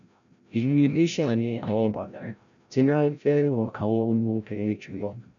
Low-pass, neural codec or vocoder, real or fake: 7.2 kHz; codec, 16 kHz, 0.5 kbps, FreqCodec, larger model; fake